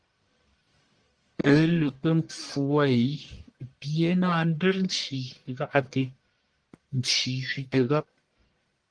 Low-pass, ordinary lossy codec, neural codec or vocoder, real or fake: 9.9 kHz; Opus, 24 kbps; codec, 44.1 kHz, 1.7 kbps, Pupu-Codec; fake